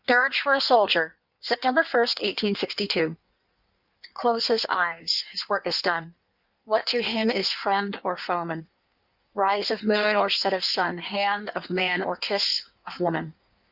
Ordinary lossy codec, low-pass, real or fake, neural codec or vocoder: Opus, 64 kbps; 5.4 kHz; fake; codec, 16 kHz in and 24 kHz out, 1.1 kbps, FireRedTTS-2 codec